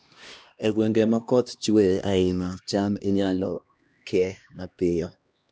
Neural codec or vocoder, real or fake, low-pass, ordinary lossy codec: codec, 16 kHz, 1 kbps, X-Codec, HuBERT features, trained on LibriSpeech; fake; none; none